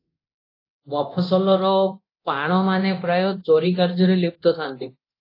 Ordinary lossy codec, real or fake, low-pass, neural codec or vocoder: AAC, 32 kbps; fake; 5.4 kHz; codec, 24 kHz, 0.9 kbps, DualCodec